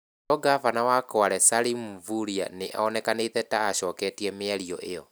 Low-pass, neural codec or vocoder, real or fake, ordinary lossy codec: none; none; real; none